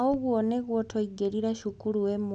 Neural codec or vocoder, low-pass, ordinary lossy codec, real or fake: none; 10.8 kHz; none; real